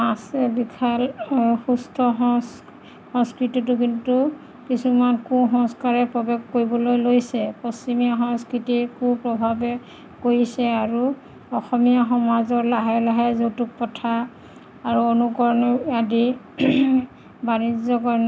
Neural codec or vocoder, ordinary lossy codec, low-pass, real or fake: none; none; none; real